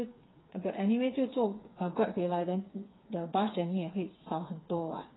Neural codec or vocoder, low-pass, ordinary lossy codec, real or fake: codec, 16 kHz, 4 kbps, FreqCodec, smaller model; 7.2 kHz; AAC, 16 kbps; fake